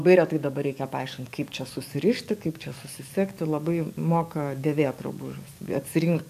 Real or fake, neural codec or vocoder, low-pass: fake; codec, 44.1 kHz, 7.8 kbps, DAC; 14.4 kHz